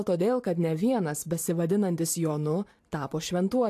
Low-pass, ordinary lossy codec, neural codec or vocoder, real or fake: 14.4 kHz; AAC, 64 kbps; vocoder, 44.1 kHz, 128 mel bands every 512 samples, BigVGAN v2; fake